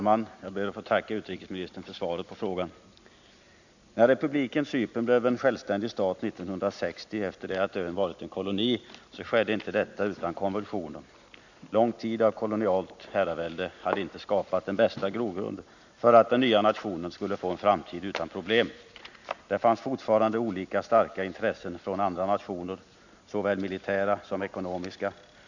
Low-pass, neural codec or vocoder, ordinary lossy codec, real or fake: 7.2 kHz; none; none; real